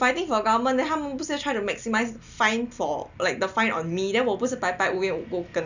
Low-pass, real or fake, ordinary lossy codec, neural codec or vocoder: 7.2 kHz; real; none; none